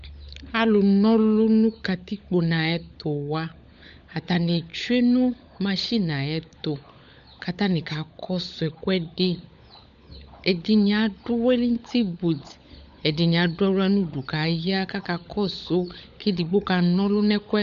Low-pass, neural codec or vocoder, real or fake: 7.2 kHz; codec, 16 kHz, 16 kbps, FunCodec, trained on LibriTTS, 50 frames a second; fake